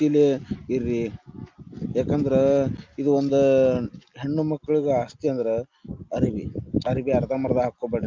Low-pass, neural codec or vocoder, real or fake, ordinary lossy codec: 7.2 kHz; none; real; Opus, 24 kbps